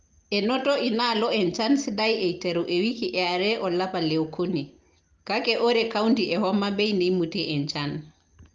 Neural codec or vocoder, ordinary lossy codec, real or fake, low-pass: none; Opus, 32 kbps; real; 7.2 kHz